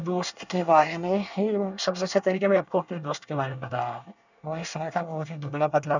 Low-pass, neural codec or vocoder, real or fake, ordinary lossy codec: 7.2 kHz; codec, 24 kHz, 1 kbps, SNAC; fake; none